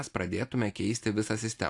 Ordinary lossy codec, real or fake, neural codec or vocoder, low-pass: AAC, 64 kbps; real; none; 10.8 kHz